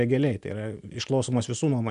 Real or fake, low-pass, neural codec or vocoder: real; 10.8 kHz; none